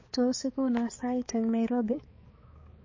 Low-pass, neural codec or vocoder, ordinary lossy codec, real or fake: 7.2 kHz; codec, 16 kHz, 4 kbps, X-Codec, HuBERT features, trained on balanced general audio; MP3, 32 kbps; fake